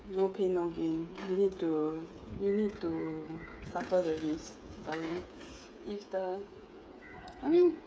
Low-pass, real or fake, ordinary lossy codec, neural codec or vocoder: none; fake; none; codec, 16 kHz, 8 kbps, FreqCodec, smaller model